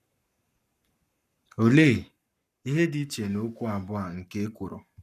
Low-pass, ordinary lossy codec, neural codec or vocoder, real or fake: 14.4 kHz; none; codec, 44.1 kHz, 7.8 kbps, Pupu-Codec; fake